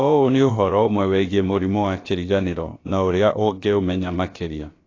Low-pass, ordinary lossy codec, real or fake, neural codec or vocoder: 7.2 kHz; AAC, 32 kbps; fake; codec, 16 kHz, about 1 kbps, DyCAST, with the encoder's durations